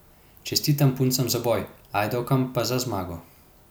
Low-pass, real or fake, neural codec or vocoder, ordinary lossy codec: none; real; none; none